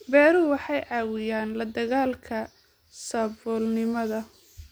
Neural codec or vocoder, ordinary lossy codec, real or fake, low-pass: none; none; real; none